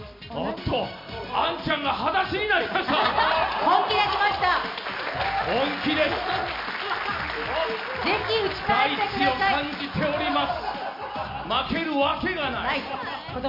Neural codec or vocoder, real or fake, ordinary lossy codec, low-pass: none; real; MP3, 32 kbps; 5.4 kHz